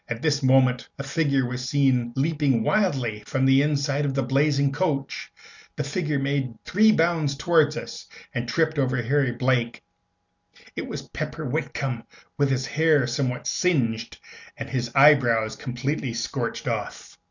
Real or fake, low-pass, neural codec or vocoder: real; 7.2 kHz; none